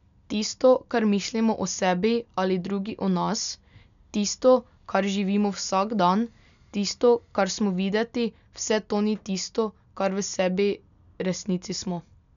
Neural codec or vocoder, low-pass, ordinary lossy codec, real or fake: none; 7.2 kHz; none; real